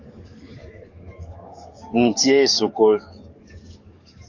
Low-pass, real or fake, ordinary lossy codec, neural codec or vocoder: 7.2 kHz; fake; AAC, 48 kbps; codec, 44.1 kHz, 7.8 kbps, DAC